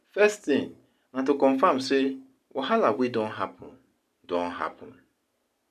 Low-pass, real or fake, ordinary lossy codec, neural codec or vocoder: 14.4 kHz; real; none; none